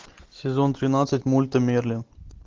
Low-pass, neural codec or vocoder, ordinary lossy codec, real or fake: 7.2 kHz; none; Opus, 16 kbps; real